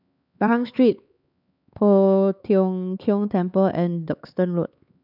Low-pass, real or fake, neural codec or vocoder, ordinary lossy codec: 5.4 kHz; fake; codec, 16 kHz, 4 kbps, X-Codec, HuBERT features, trained on LibriSpeech; none